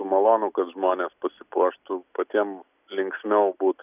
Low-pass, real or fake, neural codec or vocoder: 3.6 kHz; real; none